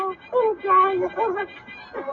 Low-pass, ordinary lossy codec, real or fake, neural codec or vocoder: 7.2 kHz; AAC, 32 kbps; real; none